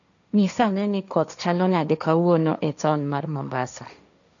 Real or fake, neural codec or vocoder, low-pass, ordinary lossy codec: fake; codec, 16 kHz, 1.1 kbps, Voila-Tokenizer; 7.2 kHz; none